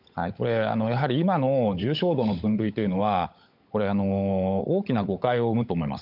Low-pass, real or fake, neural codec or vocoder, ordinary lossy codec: 5.4 kHz; fake; codec, 16 kHz, 16 kbps, FunCodec, trained on LibriTTS, 50 frames a second; none